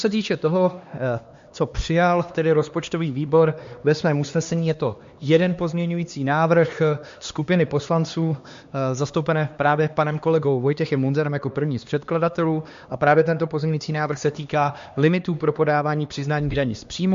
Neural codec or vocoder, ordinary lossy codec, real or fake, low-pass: codec, 16 kHz, 2 kbps, X-Codec, HuBERT features, trained on LibriSpeech; MP3, 48 kbps; fake; 7.2 kHz